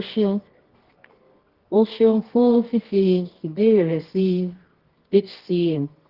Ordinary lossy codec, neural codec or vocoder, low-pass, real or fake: Opus, 16 kbps; codec, 24 kHz, 0.9 kbps, WavTokenizer, medium music audio release; 5.4 kHz; fake